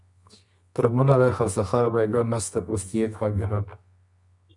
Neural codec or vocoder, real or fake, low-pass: codec, 24 kHz, 0.9 kbps, WavTokenizer, medium music audio release; fake; 10.8 kHz